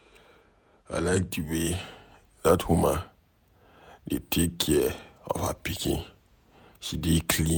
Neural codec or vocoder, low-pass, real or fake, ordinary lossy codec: vocoder, 48 kHz, 128 mel bands, Vocos; none; fake; none